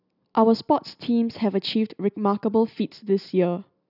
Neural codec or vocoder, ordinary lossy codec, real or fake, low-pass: none; none; real; 5.4 kHz